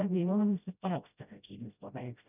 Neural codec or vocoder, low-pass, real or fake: codec, 16 kHz, 0.5 kbps, FreqCodec, smaller model; 3.6 kHz; fake